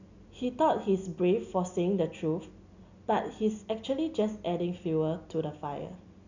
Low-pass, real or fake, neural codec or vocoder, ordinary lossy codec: 7.2 kHz; real; none; none